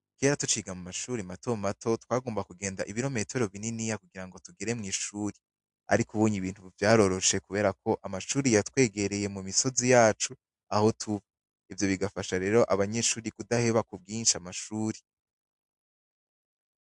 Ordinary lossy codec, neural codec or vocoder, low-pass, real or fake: MP3, 64 kbps; none; 10.8 kHz; real